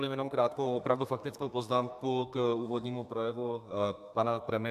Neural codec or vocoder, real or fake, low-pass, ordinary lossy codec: codec, 32 kHz, 1.9 kbps, SNAC; fake; 14.4 kHz; AAC, 96 kbps